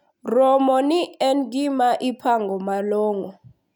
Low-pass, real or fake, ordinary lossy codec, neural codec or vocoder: 19.8 kHz; real; none; none